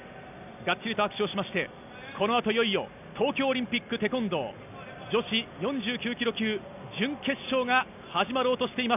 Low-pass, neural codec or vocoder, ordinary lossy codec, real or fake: 3.6 kHz; none; none; real